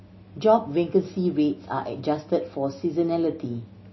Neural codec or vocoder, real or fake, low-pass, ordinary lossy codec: none; real; 7.2 kHz; MP3, 24 kbps